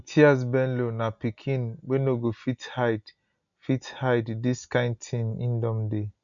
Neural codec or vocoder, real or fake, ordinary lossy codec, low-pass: none; real; none; 7.2 kHz